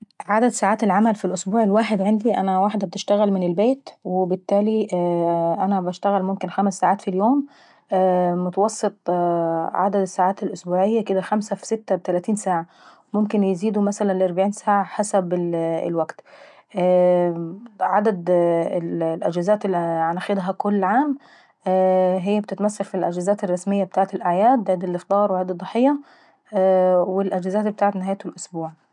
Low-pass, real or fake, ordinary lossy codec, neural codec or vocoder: 10.8 kHz; real; MP3, 96 kbps; none